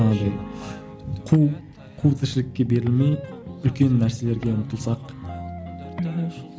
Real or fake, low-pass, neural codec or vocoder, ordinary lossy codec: real; none; none; none